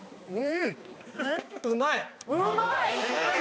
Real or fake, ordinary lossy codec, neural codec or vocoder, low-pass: fake; none; codec, 16 kHz, 2 kbps, X-Codec, HuBERT features, trained on balanced general audio; none